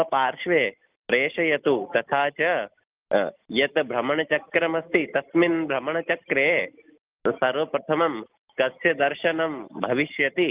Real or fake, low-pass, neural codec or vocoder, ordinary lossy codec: real; 3.6 kHz; none; Opus, 24 kbps